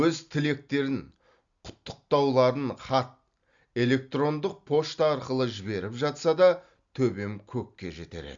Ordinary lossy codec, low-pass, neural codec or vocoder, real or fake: Opus, 64 kbps; 7.2 kHz; none; real